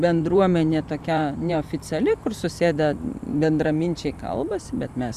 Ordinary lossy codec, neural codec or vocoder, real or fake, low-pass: Opus, 64 kbps; vocoder, 44.1 kHz, 128 mel bands every 512 samples, BigVGAN v2; fake; 14.4 kHz